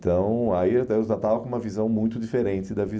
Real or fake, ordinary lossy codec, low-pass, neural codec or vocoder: real; none; none; none